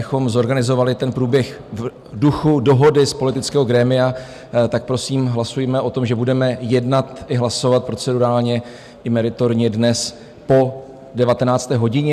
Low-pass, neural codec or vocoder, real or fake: 14.4 kHz; none; real